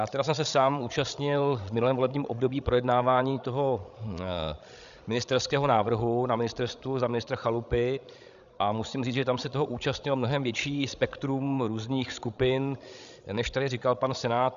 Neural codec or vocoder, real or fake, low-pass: codec, 16 kHz, 16 kbps, FreqCodec, larger model; fake; 7.2 kHz